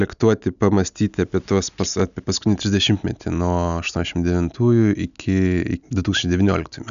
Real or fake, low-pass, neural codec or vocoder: real; 7.2 kHz; none